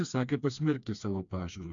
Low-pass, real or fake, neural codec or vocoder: 7.2 kHz; fake; codec, 16 kHz, 2 kbps, FreqCodec, smaller model